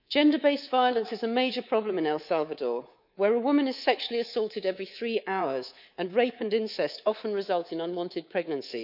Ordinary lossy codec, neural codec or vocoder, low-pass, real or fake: none; codec, 24 kHz, 3.1 kbps, DualCodec; 5.4 kHz; fake